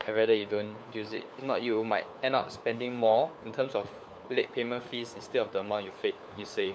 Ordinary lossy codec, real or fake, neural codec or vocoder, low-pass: none; fake; codec, 16 kHz, 4 kbps, FunCodec, trained on LibriTTS, 50 frames a second; none